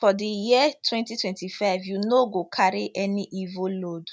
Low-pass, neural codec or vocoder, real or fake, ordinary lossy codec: none; none; real; none